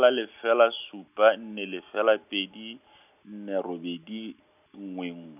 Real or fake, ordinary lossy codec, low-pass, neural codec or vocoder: real; none; 3.6 kHz; none